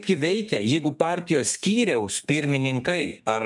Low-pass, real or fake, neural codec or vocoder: 10.8 kHz; fake; codec, 32 kHz, 1.9 kbps, SNAC